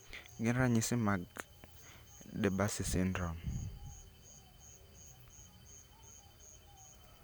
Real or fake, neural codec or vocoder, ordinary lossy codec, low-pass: real; none; none; none